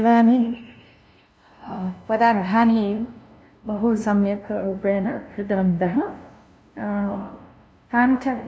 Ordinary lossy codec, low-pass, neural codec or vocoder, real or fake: none; none; codec, 16 kHz, 0.5 kbps, FunCodec, trained on LibriTTS, 25 frames a second; fake